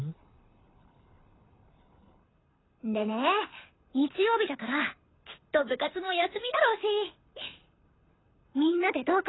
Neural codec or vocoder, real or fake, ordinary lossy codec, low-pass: codec, 24 kHz, 6 kbps, HILCodec; fake; AAC, 16 kbps; 7.2 kHz